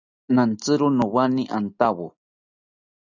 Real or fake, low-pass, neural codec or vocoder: real; 7.2 kHz; none